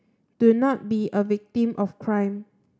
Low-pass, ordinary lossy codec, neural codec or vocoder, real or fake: none; none; none; real